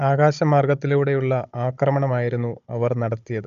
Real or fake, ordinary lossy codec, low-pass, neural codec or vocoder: fake; AAC, 96 kbps; 7.2 kHz; codec, 16 kHz, 16 kbps, FunCodec, trained on Chinese and English, 50 frames a second